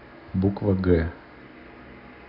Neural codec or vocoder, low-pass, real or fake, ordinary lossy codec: none; 5.4 kHz; real; none